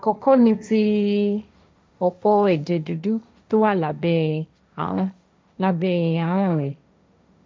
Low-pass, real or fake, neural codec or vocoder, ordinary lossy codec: none; fake; codec, 16 kHz, 1.1 kbps, Voila-Tokenizer; none